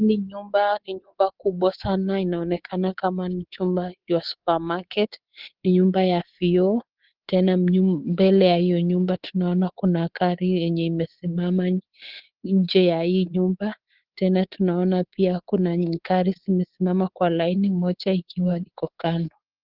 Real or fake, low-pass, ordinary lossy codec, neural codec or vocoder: fake; 5.4 kHz; Opus, 16 kbps; codec, 24 kHz, 3.1 kbps, DualCodec